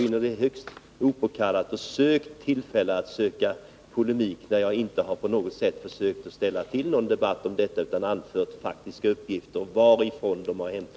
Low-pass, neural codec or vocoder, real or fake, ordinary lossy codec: none; none; real; none